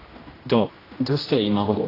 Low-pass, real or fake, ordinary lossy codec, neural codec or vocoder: 5.4 kHz; fake; AAC, 24 kbps; codec, 16 kHz, 1 kbps, X-Codec, HuBERT features, trained on general audio